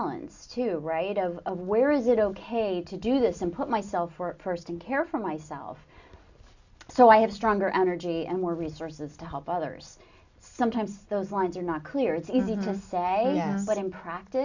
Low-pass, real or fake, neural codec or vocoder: 7.2 kHz; real; none